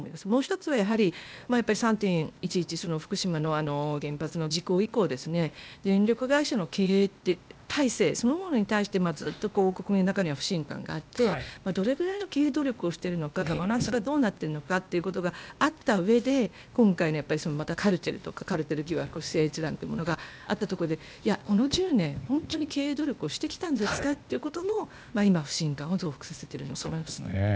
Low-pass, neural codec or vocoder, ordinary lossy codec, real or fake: none; codec, 16 kHz, 0.8 kbps, ZipCodec; none; fake